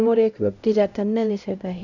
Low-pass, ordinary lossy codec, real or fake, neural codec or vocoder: 7.2 kHz; none; fake; codec, 16 kHz, 0.5 kbps, X-Codec, HuBERT features, trained on LibriSpeech